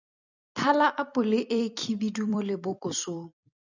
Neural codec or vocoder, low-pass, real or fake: vocoder, 44.1 kHz, 128 mel bands every 256 samples, BigVGAN v2; 7.2 kHz; fake